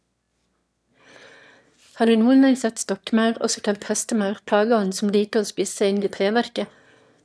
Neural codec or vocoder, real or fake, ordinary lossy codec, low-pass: autoencoder, 22.05 kHz, a latent of 192 numbers a frame, VITS, trained on one speaker; fake; none; none